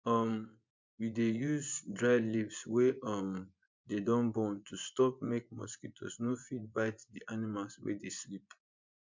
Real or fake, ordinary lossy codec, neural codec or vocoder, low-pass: fake; MP3, 64 kbps; vocoder, 24 kHz, 100 mel bands, Vocos; 7.2 kHz